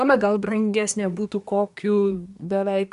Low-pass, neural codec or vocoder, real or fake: 10.8 kHz; codec, 24 kHz, 1 kbps, SNAC; fake